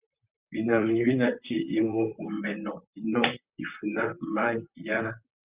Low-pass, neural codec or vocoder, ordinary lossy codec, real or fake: 3.6 kHz; vocoder, 44.1 kHz, 128 mel bands, Pupu-Vocoder; Opus, 64 kbps; fake